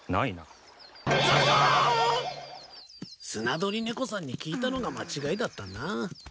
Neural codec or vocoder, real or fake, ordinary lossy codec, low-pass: none; real; none; none